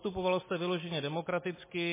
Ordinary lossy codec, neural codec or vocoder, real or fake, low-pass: MP3, 16 kbps; none; real; 3.6 kHz